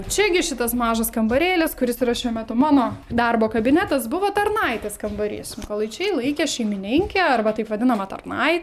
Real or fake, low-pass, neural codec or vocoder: real; 14.4 kHz; none